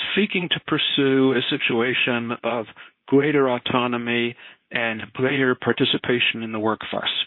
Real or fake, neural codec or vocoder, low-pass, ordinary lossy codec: fake; codec, 24 kHz, 0.9 kbps, WavTokenizer, medium speech release version 2; 5.4 kHz; MP3, 24 kbps